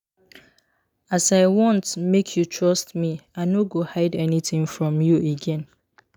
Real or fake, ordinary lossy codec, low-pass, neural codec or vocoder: real; none; 19.8 kHz; none